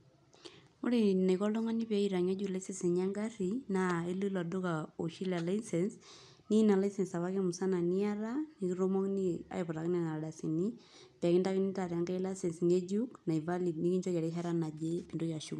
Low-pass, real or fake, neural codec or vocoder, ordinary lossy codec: none; real; none; none